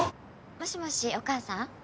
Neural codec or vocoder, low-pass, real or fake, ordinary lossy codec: none; none; real; none